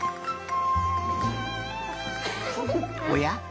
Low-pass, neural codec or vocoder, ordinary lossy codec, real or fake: none; none; none; real